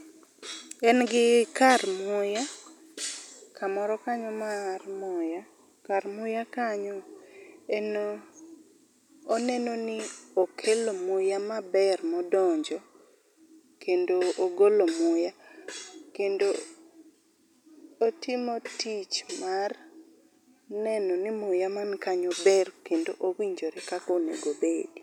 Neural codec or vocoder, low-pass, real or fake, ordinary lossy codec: none; 19.8 kHz; real; none